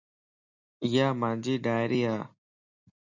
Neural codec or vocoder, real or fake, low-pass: none; real; 7.2 kHz